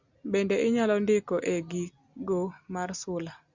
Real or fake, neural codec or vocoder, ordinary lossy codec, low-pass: real; none; Opus, 64 kbps; 7.2 kHz